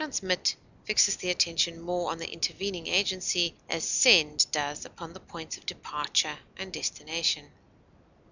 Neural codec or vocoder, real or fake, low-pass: none; real; 7.2 kHz